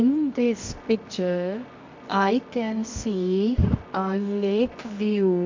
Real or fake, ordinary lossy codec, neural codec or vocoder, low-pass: fake; MP3, 64 kbps; codec, 24 kHz, 0.9 kbps, WavTokenizer, medium music audio release; 7.2 kHz